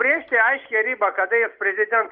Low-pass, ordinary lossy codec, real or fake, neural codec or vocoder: 5.4 kHz; Opus, 32 kbps; real; none